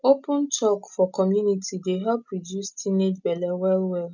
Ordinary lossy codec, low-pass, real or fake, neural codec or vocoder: none; 7.2 kHz; real; none